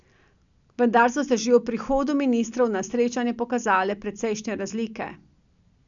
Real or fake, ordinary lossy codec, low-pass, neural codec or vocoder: real; none; 7.2 kHz; none